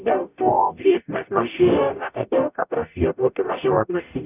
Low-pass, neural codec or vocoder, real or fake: 3.6 kHz; codec, 44.1 kHz, 0.9 kbps, DAC; fake